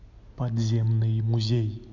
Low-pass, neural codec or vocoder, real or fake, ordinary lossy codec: 7.2 kHz; none; real; none